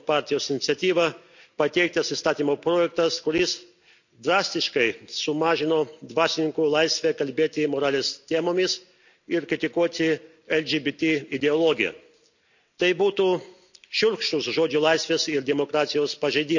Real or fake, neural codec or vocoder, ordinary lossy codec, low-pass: real; none; none; 7.2 kHz